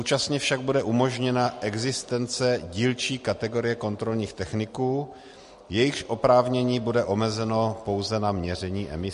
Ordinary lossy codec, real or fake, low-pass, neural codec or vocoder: MP3, 48 kbps; real; 14.4 kHz; none